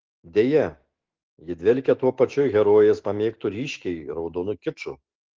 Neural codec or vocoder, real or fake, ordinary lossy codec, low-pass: autoencoder, 48 kHz, 128 numbers a frame, DAC-VAE, trained on Japanese speech; fake; Opus, 16 kbps; 7.2 kHz